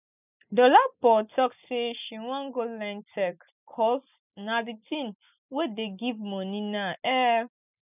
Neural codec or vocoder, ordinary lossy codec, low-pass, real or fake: none; none; 3.6 kHz; real